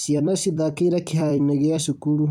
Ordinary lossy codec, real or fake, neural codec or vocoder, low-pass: none; fake; vocoder, 44.1 kHz, 128 mel bands every 256 samples, BigVGAN v2; 19.8 kHz